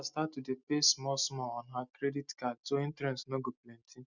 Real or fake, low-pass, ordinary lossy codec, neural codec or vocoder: real; 7.2 kHz; none; none